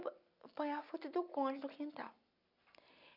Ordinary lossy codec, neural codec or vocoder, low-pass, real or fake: none; none; 5.4 kHz; real